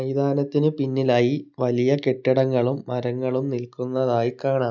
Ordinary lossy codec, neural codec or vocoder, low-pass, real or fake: none; none; 7.2 kHz; real